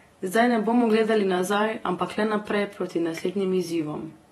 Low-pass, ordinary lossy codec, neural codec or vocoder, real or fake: 19.8 kHz; AAC, 32 kbps; vocoder, 48 kHz, 128 mel bands, Vocos; fake